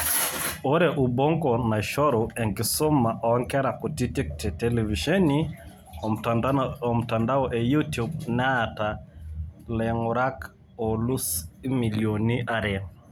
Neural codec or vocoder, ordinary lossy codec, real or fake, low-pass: none; none; real; none